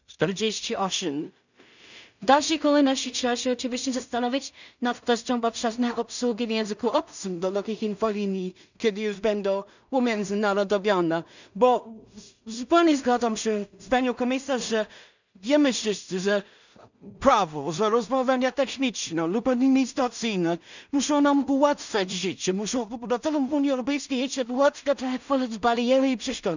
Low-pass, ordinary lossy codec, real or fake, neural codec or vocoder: 7.2 kHz; none; fake; codec, 16 kHz in and 24 kHz out, 0.4 kbps, LongCat-Audio-Codec, two codebook decoder